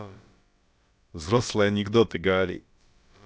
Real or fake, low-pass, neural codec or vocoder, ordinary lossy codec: fake; none; codec, 16 kHz, about 1 kbps, DyCAST, with the encoder's durations; none